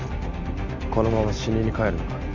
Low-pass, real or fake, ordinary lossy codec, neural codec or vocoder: 7.2 kHz; real; none; none